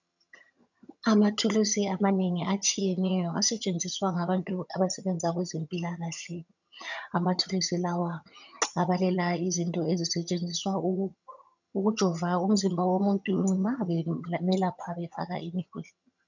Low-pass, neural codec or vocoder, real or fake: 7.2 kHz; vocoder, 22.05 kHz, 80 mel bands, HiFi-GAN; fake